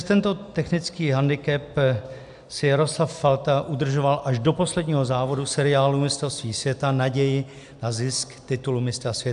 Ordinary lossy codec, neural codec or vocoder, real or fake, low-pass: AAC, 96 kbps; none; real; 10.8 kHz